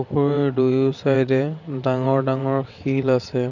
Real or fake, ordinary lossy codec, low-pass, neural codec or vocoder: fake; none; 7.2 kHz; vocoder, 22.05 kHz, 80 mel bands, WaveNeXt